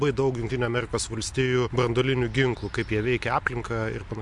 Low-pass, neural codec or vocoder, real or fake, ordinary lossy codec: 10.8 kHz; none; real; MP3, 64 kbps